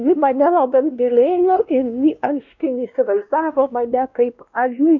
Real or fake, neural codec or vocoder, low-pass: fake; codec, 16 kHz, 1 kbps, X-Codec, WavLM features, trained on Multilingual LibriSpeech; 7.2 kHz